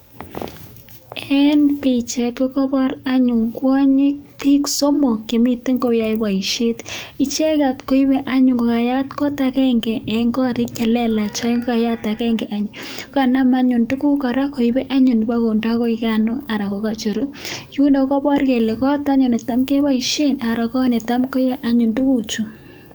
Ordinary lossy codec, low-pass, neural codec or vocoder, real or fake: none; none; codec, 44.1 kHz, 7.8 kbps, DAC; fake